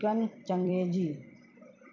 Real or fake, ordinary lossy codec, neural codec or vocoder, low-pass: fake; none; vocoder, 44.1 kHz, 128 mel bands every 256 samples, BigVGAN v2; 7.2 kHz